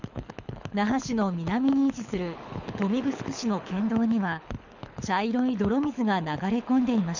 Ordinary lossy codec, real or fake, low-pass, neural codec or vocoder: none; fake; 7.2 kHz; codec, 24 kHz, 6 kbps, HILCodec